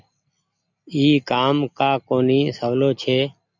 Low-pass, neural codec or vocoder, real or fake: 7.2 kHz; none; real